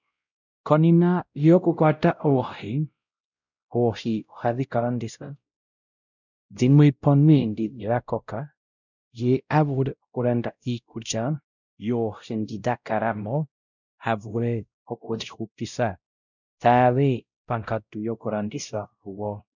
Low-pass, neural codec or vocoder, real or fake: 7.2 kHz; codec, 16 kHz, 0.5 kbps, X-Codec, WavLM features, trained on Multilingual LibriSpeech; fake